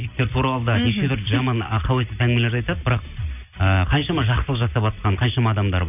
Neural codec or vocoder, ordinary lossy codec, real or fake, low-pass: none; none; real; 3.6 kHz